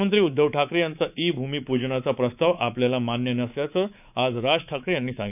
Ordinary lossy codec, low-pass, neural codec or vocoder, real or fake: none; 3.6 kHz; codec, 24 kHz, 3.1 kbps, DualCodec; fake